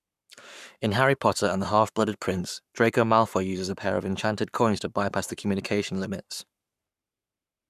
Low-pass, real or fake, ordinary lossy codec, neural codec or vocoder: 14.4 kHz; fake; none; codec, 44.1 kHz, 7.8 kbps, Pupu-Codec